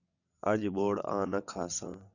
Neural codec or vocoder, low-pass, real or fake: vocoder, 22.05 kHz, 80 mel bands, WaveNeXt; 7.2 kHz; fake